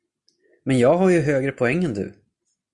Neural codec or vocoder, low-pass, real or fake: none; 10.8 kHz; real